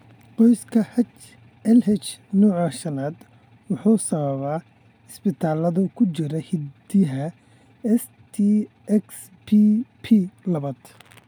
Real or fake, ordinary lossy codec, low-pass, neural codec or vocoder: real; none; 19.8 kHz; none